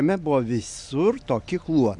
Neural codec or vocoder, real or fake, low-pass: none; real; 10.8 kHz